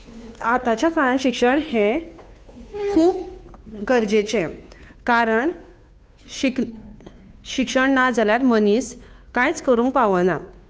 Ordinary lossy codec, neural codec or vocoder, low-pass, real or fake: none; codec, 16 kHz, 2 kbps, FunCodec, trained on Chinese and English, 25 frames a second; none; fake